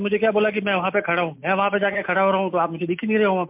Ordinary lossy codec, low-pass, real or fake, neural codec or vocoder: MP3, 32 kbps; 3.6 kHz; real; none